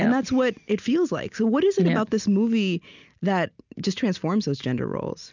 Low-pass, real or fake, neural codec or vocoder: 7.2 kHz; real; none